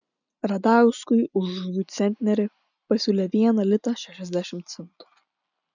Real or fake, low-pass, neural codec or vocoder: real; 7.2 kHz; none